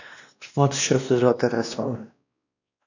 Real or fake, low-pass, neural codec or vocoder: fake; 7.2 kHz; codec, 16 kHz, 1 kbps, X-Codec, WavLM features, trained on Multilingual LibriSpeech